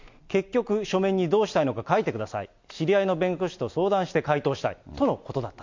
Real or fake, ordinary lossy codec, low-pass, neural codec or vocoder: real; MP3, 48 kbps; 7.2 kHz; none